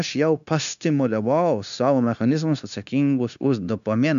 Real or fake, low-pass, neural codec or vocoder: fake; 7.2 kHz; codec, 16 kHz, 0.9 kbps, LongCat-Audio-Codec